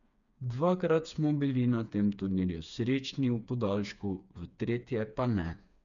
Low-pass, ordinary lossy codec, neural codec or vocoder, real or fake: 7.2 kHz; none; codec, 16 kHz, 4 kbps, FreqCodec, smaller model; fake